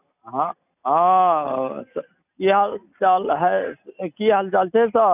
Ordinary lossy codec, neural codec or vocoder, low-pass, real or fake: none; none; 3.6 kHz; real